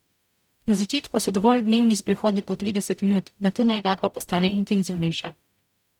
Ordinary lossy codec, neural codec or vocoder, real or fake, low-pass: none; codec, 44.1 kHz, 0.9 kbps, DAC; fake; 19.8 kHz